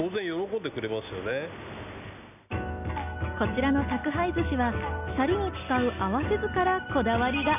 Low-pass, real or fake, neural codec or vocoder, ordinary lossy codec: 3.6 kHz; real; none; none